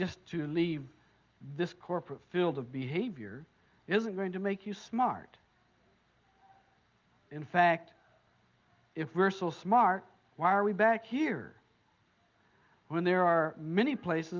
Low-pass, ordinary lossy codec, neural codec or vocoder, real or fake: 7.2 kHz; Opus, 32 kbps; none; real